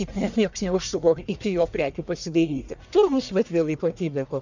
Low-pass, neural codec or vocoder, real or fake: 7.2 kHz; codec, 44.1 kHz, 1.7 kbps, Pupu-Codec; fake